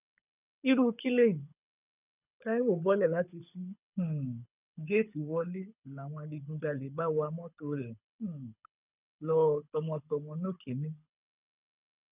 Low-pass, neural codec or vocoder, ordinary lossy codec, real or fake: 3.6 kHz; codec, 24 kHz, 6 kbps, HILCodec; none; fake